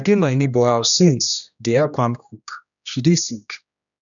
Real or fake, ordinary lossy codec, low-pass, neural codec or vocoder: fake; none; 7.2 kHz; codec, 16 kHz, 1 kbps, X-Codec, HuBERT features, trained on general audio